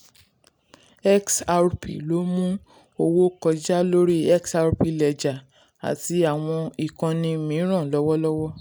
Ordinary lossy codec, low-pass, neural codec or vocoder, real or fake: none; none; none; real